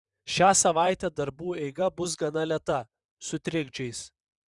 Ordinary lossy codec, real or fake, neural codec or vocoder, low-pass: Opus, 64 kbps; fake; vocoder, 44.1 kHz, 128 mel bands, Pupu-Vocoder; 10.8 kHz